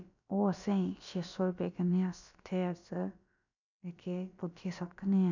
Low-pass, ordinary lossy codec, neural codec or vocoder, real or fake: 7.2 kHz; none; codec, 16 kHz, about 1 kbps, DyCAST, with the encoder's durations; fake